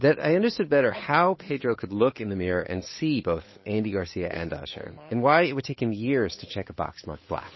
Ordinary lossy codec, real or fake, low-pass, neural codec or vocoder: MP3, 24 kbps; fake; 7.2 kHz; codec, 16 kHz, 8 kbps, FunCodec, trained on Chinese and English, 25 frames a second